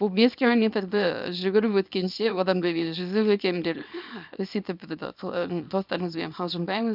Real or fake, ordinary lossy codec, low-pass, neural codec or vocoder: fake; none; 5.4 kHz; codec, 24 kHz, 0.9 kbps, WavTokenizer, small release